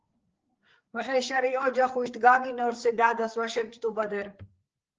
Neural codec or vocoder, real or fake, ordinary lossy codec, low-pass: codec, 16 kHz, 4 kbps, FreqCodec, larger model; fake; Opus, 16 kbps; 7.2 kHz